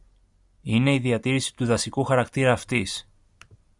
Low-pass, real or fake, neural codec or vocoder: 10.8 kHz; real; none